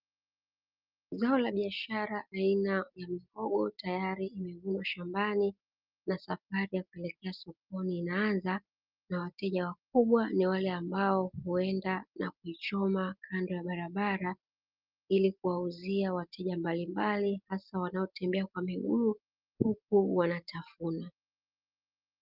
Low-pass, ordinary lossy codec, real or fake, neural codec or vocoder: 5.4 kHz; Opus, 24 kbps; real; none